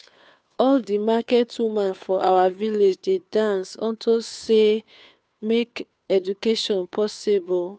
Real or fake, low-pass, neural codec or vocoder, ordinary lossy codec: fake; none; codec, 16 kHz, 2 kbps, FunCodec, trained on Chinese and English, 25 frames a second; none